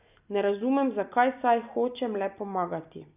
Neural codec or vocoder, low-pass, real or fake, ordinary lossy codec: none; 3.6 kHz; real; none